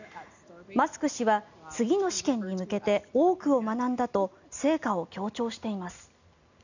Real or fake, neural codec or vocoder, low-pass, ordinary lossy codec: real; none; 7.2 kHz; none